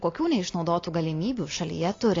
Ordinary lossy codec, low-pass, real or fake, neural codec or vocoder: AAC, 32 kbps; 7.2 kHz; real; none